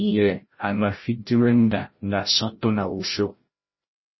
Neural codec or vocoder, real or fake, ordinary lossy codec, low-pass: codec, 16 kHz, 0.5 kbps, FreqCodec, larger model; fake; MP3, 24 kbps; 7.2 kHz